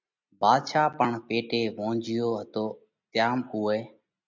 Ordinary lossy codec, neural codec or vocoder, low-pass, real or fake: MP3, 64 kbps; none; 7.2 kHz; real